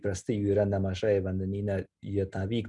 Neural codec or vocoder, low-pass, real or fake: none; 10.8 kHz; real